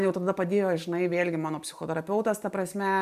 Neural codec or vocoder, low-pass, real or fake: none; 14.4 kHz; real